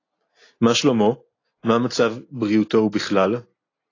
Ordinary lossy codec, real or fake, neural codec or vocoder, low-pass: AAC, 32 kbps; real; none; 7.2 kHz